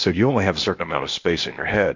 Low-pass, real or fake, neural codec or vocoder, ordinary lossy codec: 7.2 kHz; fake; codec, 16 kHz, 0.8 kbps, ZipCodec; MP3, 48 kbps